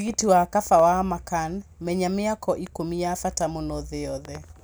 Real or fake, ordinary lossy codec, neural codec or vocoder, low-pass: real; none; none; none